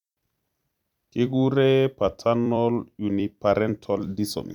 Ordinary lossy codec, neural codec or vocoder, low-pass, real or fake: none; vocoder, 44.1 kHz, 128 mel bands every 256 samples, BigVGAN v2; 19.8 kHz; fake